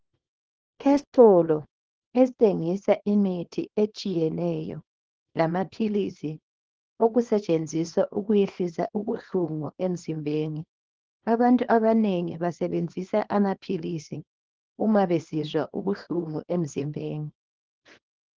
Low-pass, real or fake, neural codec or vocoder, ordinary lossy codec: 7.2 kHz; fake; codec, 24 kHz, 0.9 kbps, WavTokenizer, small release; Opus, 16 kbps